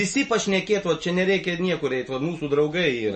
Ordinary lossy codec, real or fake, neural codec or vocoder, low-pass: MP3, 32 kbps; real; none; 10.8 kHz